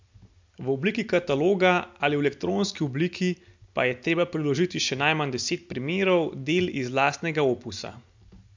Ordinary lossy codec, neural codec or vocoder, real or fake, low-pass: MP3, 64 kbps; none; real; 7.2 kHz